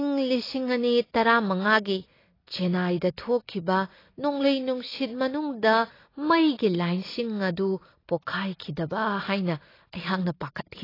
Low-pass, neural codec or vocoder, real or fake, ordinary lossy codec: 5.4 kHz; none; real; AAC, 24 kbps